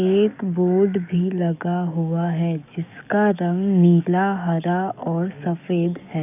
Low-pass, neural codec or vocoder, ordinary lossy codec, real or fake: 3.6 kHz; codec, 44.1 kHz, 7.8 kbps, DAC; none; fake